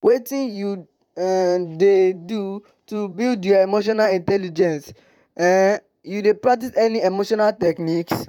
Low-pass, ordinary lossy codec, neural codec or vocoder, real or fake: 19.8 kHz; none; vocoder, 44.1 kHz, 128 mel bands, Pupu-Vocoder; fake